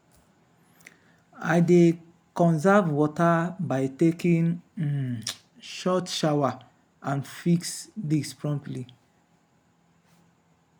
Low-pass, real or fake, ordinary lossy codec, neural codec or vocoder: none; real; none; none